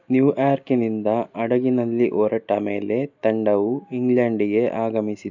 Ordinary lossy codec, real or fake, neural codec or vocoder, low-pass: none; real; none; 7.2 kHz